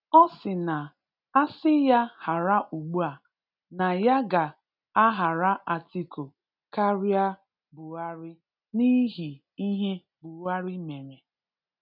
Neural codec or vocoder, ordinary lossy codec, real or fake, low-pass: none; none; real; 5.4 kHz